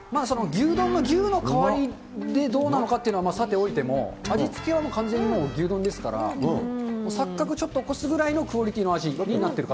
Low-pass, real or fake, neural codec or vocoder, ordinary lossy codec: none; real; none; none